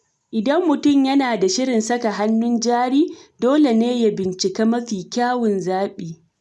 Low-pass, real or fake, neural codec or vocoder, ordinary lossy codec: none; real; none; none